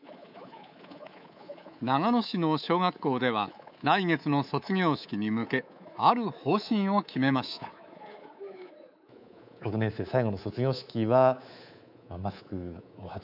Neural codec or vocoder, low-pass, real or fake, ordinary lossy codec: codec, 24 kHz, 3.1 kbps, DualCodec; 5.4 kHz; fake; none